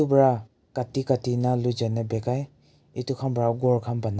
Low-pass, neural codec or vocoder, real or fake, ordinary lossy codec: none; none; real; none